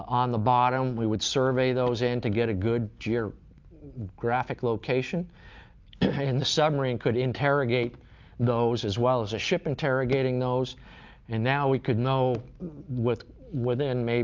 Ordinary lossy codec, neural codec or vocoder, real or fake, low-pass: Opus, 24 kbps; none; real; 7.2 kHz